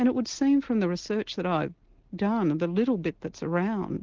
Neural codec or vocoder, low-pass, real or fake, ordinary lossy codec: none; 7.2 kHz; real; Opus, 16 kbps